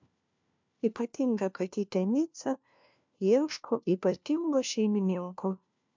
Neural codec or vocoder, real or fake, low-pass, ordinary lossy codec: codec, 16 kHz, 1 kbps, FunCodec, trained on LibriTTS, 50 frames a second; fake; 7.2 kHz; MP3, 64 kbps